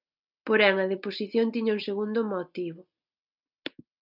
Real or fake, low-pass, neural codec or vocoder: real; 5.4 kHz; none